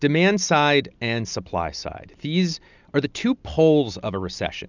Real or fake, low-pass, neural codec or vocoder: real; 7.2 kHz; none